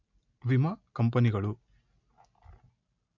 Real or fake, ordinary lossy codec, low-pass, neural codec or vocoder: real; none; 7.2 kHz; none